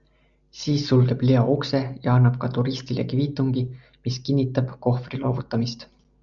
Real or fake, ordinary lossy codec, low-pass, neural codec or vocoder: real; MP3, 96 kbps; 7.2 kHz; none